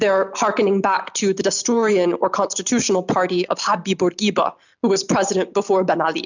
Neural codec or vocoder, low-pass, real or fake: none; 7.2 kHz; real